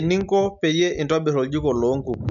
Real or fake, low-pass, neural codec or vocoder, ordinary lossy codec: real; 7.2 kHz; none; none